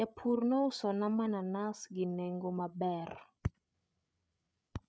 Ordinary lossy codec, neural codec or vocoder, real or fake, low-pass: none; codec, 16 kHz, 8 kbps, FreqCodec, larger model; fake; none